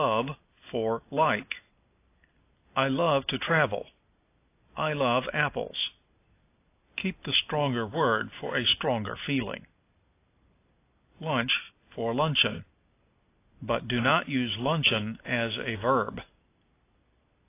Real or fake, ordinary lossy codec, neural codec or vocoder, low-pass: real; AAC, 24 kbps; none; 3.6 kHz